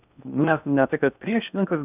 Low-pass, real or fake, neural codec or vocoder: 3.6 kHz; fake; codec, 16 kHz in and 24 kHz out, 0.6 kbps, FocalCodec, streaming, 2048 codes